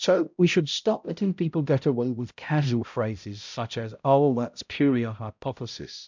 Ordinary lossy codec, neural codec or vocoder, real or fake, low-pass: MP3, 64 kbps; codec, 16 kHz, 0.5 kbps, X-Codec, HuBERT features, trained on balanced general audio; fake; 7.2 kHz